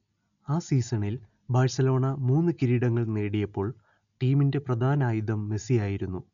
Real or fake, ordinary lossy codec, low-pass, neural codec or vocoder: real; MP3, 96 kbps; 7.2 kHz; none